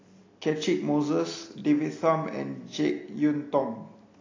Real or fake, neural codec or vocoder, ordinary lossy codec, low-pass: real; none; AAC, 32 kbps; 7.2 kHz